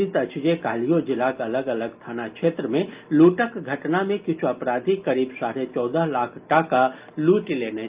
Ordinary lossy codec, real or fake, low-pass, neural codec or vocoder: Opus, 32 kbps; real; 3.6 kHz; none